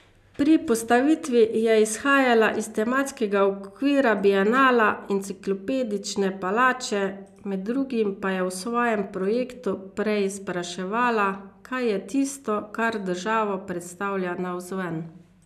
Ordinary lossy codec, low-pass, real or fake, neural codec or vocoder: none; 14.4 kHz; real; none